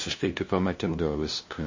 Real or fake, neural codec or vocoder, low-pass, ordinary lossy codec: fake; codec, 16 kHz, 0.5 kbps, FunCodec, trained on LibriTTS, 25 frames a second; 7.2 kHz; MP3, 32 kbps